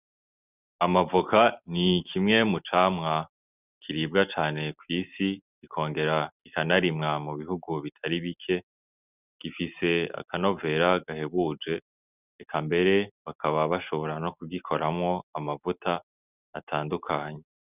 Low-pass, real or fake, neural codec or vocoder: 3.6 kHz; real; none